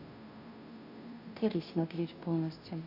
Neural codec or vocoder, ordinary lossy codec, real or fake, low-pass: codec, 16 kHz, 0.5 kbps, FunCodec, trained on Chinese and English, 25 frames a second; none; fake; 5.4 kHz